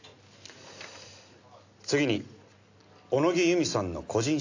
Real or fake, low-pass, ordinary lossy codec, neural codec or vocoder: real; 7.2 kHz; AAC, 48 kbps; none